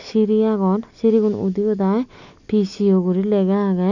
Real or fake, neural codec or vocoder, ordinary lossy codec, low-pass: real; none; none; 7.2 kHz